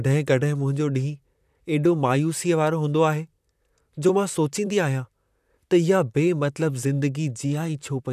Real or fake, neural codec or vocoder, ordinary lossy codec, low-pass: fake; vocoder, 44.1 kHz, 128 mel bands, Pupu-Vocoder; none; 14.4 kHz